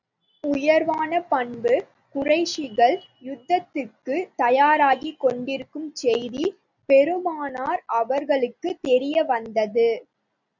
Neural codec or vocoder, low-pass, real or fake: none; 7.2 kHz; real